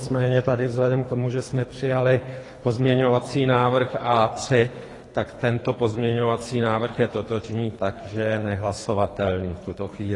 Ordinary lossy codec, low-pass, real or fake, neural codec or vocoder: AAC, 32 kbps; 10.8 kHz; fake; codec, 24 kHz, 3 kbps, HILCodec